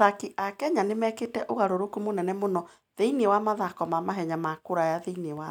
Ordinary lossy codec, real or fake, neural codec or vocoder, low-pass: none; real; none; 19.8 kHz